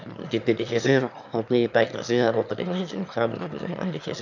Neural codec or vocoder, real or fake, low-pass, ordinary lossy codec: autoencoder, 22.05 kHz, a latent of 192 numbers a frame, VITS, trained on one speaker; fake; 7.2 kHz; none